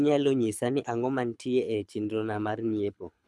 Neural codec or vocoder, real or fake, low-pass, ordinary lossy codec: codec, 24 kHz, 6 kbps, HILCodec; fake; none; none